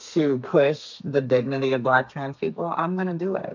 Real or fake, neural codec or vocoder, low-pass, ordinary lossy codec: fake; codec, 32 kHz, 1.9 kbps, SNAC; 7.2 kHz; MP3, 64 kbps